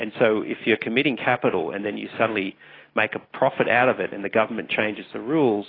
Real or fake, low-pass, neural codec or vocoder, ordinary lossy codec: real; 5.4 kHz; none; AAC, 24 kbps